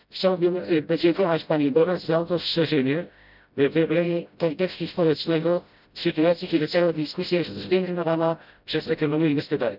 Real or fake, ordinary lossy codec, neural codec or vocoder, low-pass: fake; none; codec, 16 kHz, 0.5 kbps, FreqCodec, smaller model; 5.4 kHz